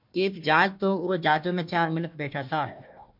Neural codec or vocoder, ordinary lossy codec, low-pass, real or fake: codec, 16 kHz, 1 kbps, FunCodec, trained on Chinese and English, 50 frames a second; MP3, 48 kbps; 5.4 kHz; fake